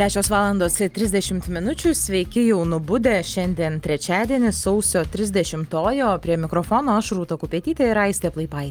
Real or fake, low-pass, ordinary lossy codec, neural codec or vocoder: real; 19.8 kHz; Opus, 32 kbps; none